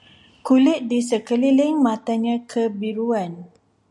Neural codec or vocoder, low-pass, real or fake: none; 10.8 kHz; real